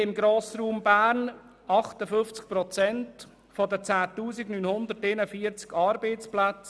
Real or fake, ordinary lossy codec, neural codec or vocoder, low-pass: real; none; none; none